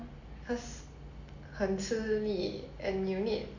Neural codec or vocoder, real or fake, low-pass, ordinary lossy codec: none; real; 7.2 kHz; none